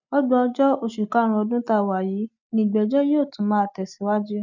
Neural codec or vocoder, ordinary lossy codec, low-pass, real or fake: none; none; 7.2 kHz; real